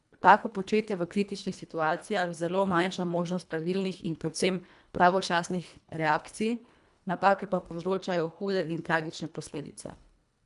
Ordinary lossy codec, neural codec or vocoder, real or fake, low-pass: none; codec, 24 kHz, 1.5 kbps, HILCodec; fake; 10.8 kHz